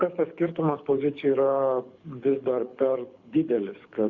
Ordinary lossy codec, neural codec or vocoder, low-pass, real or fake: Opus, 64 kbps; codec, 24 kHz, 6 kbps, HILCodec; 7.2 kHz; fake